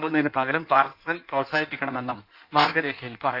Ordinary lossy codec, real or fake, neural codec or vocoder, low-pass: none; fake; codec, 16 kHz in and 24 kHz out, 1.1 kbps, FireRedTTS-2 codec; 5.4 kHz